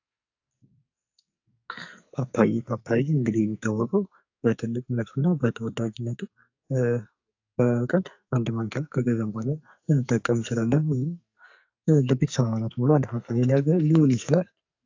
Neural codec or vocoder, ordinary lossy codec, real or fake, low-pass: codec, 44.1 kHz, 2.6 kbps, SNAC; AAC, 48 kbps; fake; 7.2 kHz